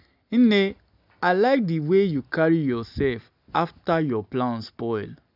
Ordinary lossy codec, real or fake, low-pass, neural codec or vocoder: none; real; 5.4 kHz; none